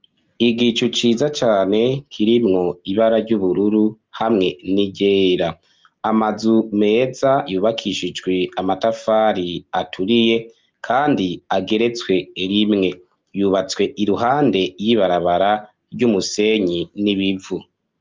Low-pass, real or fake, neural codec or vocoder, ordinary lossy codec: 7.2 kHz; real; none; Opus, 24 kbps